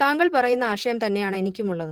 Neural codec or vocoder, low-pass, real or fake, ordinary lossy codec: vocoder, 44.1 kHz, 128 mel bands, Pupu-Vocoder; 19.8 kHz; fake; Opus, 16 kbps